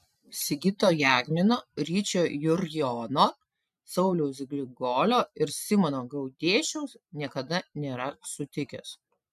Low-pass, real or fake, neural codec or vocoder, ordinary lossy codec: 14.4 kHz; real; none; MP3, 96 kbps